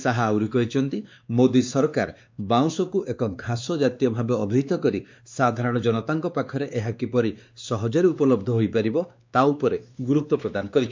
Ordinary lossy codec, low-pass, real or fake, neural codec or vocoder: MP3, 64 kbps; 7.2 kHz; fake; codec, 16 kHz, 2 kbps, X-Codec, WavLM features, trained on Multilingual LibriSpeech